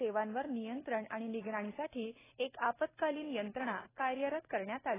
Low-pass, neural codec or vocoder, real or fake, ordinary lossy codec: 3.6 kHz; none; real; AAC, 16 kbps